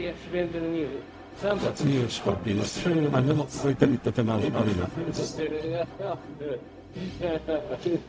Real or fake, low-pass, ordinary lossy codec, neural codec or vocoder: fake; none; none; codec, 16 kHz, 0.4 kbps, LongCat-Audio-Codec